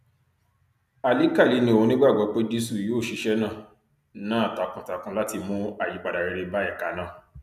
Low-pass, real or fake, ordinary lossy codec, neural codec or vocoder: 14.4 kHz; real; none; none